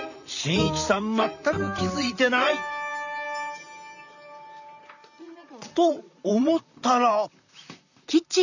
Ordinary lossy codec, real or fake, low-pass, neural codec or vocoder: none; fake; 7.2 kHz; vocoder, 44.1 kHz, 128 mel bands, Pupu-Vocoder